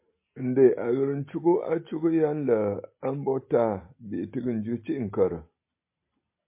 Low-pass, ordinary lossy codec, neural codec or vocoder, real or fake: 3.6 kHz; MP3, 24 kbps; none; real